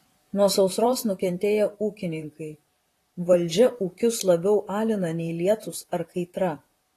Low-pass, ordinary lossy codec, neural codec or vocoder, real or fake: 14.4 kHz; AAC, 48 kbps; vocoder, 44.1 kHz, 128 mel bands, Pupu-Vocoder; fake